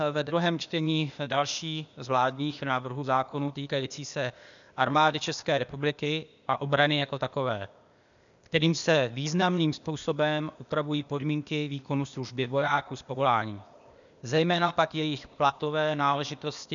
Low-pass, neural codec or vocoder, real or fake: 7.2 kHz; codec, 16 kHz, 0.8 kbps, ZipCodec; fake